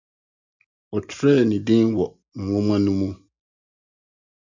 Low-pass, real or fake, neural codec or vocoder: 7.2 kHz; real; none